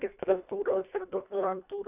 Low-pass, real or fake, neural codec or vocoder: 3.6 kHz; fake; codec, 24 kHz, 1.5 kbps, HILCodec